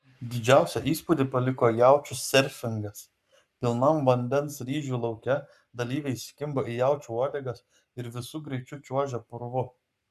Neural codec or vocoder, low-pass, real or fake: codec, 44.1 kHz, 7.8 kbps, Pupu-Codec; 14.4 kHz; fake